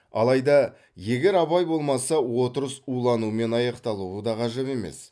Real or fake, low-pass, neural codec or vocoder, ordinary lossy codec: real; none; none; none